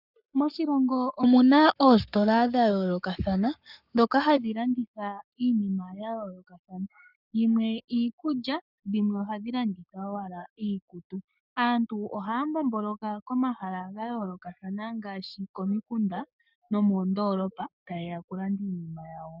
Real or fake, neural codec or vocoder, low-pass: fake; codec, 44.1 kHz, 7.8 kbps, Pupu-Codec; 5.4 kHz